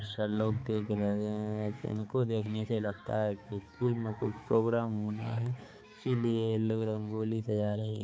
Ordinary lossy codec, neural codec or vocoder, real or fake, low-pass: none; codec, 16 kHz, 4 kbps, X-Codec, HuBERT features, trained on balanced general audio; fake; none